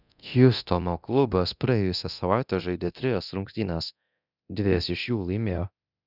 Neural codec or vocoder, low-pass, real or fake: codec, 24 kHz, 0.9 kbps, DualCodec; 5.4 kHz; fake